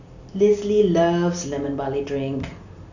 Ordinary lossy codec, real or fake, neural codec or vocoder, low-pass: none; real; none; 7.2 kHz